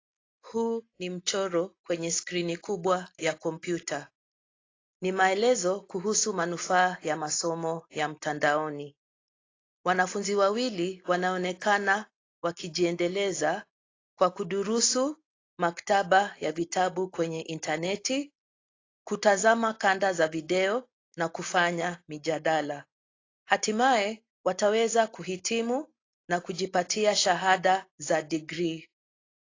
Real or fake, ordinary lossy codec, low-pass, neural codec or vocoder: real; AAC, 32 kbps; 7.2 kHz; none